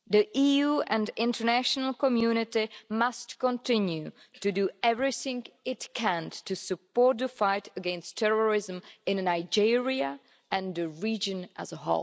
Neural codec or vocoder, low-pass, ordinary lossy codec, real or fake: none; none; none; real